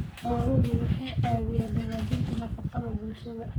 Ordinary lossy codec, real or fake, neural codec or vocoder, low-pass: none; fake; codec, 44.1 kHz, 3.4 kbps, Pupu-Codec; none